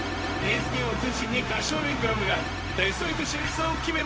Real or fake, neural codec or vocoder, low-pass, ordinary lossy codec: fake; codec, 16 kHz, 0.4 kbps, LongCat-Audio-Codec; none; none